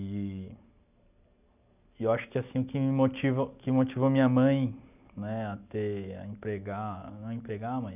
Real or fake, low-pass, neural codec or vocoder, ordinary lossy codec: real; 3.6 kHz; none; none